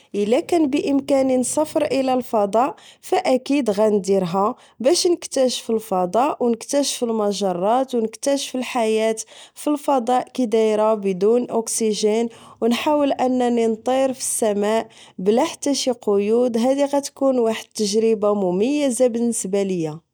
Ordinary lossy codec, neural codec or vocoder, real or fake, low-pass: none; none; real; none